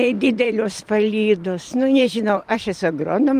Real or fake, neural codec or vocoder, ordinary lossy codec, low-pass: fake; vocoder, 44.1 kHz, 128 mel bands every 512 samples, BigVGAN v2; Opus, 32 kbps; 14.4 kHz